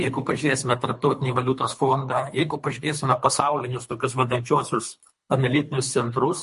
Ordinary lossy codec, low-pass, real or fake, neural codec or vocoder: MP3, 48 kbps; 10.8 kHz; fake; codec, 24 kHz, 3 kbps, HILCodec